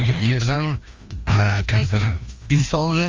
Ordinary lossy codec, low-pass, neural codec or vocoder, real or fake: Opus, 32 kbps; 7.2 kHz; codec, 16 kHz, 1 kbps, FreqCodec, larger model; fake